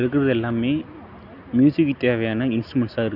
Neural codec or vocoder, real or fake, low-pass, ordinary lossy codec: none; real; 5.4 kHz; none